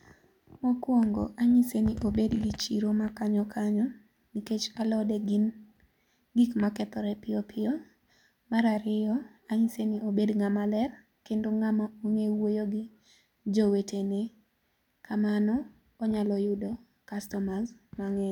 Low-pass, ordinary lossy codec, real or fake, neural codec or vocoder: 19.8 kHz; none; real; none